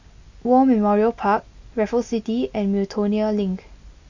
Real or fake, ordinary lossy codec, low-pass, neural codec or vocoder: real; none; 7.2 kHz; none